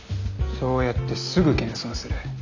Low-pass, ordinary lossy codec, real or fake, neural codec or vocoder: 7.2 kHz; none; real; none